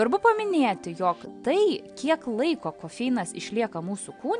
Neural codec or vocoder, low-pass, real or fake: none; 9.9 kHz; real